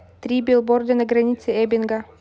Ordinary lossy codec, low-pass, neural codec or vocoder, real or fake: none; none; none; real